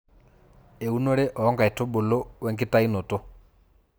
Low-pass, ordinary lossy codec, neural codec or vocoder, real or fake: none; none; none; real